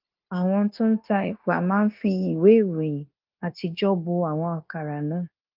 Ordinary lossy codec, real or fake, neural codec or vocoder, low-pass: Opus, 32 kbps; fake; codec, 16 kHz, 0.9 kbps, LongCat-Audio-Codec; 5.4 kHz